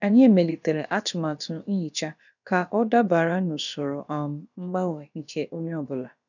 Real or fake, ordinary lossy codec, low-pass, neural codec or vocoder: fake; none; 7.2 kHz; codec, 16 kHz, about 1 kbps, DyCAST, with the encoder's durations